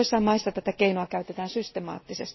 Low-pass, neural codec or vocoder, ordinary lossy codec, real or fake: 7.2 kHz; none; MP3, 24 kbps; real